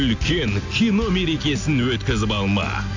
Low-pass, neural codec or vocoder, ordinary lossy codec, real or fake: 7.2 kHz; none; AAC, 48 kbps; real